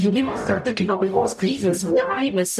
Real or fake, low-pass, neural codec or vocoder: fake; 14.4 kHz; codec, 44.1 kHz, 0.9 kbps, DAC